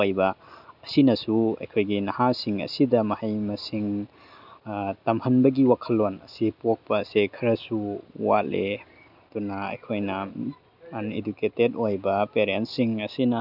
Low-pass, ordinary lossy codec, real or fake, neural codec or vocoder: 5.4 kHz; none; real; none